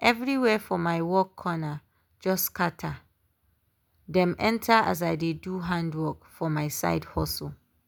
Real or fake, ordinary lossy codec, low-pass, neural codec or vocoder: real; none; none; none